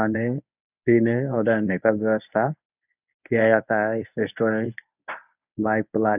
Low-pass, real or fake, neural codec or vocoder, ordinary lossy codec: 3.6 kHz; fake; codec, 24 kHz, 0.9 kbps, WavTokenizer, medium speech release version 1; none